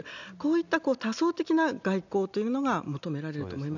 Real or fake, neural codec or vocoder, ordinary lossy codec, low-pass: real; none; none; 7.2 kHz